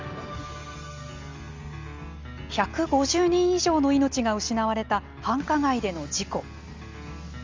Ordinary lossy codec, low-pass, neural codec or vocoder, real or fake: Opus, 32 kbps; 7.2 kHz; none; real